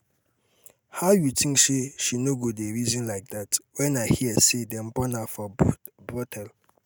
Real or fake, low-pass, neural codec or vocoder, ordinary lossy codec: real; none; none; none